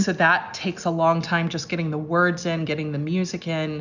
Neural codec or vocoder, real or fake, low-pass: none; real; 7.2 kHz